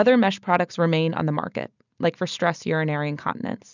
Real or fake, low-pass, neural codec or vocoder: real; 7.2 kHz; none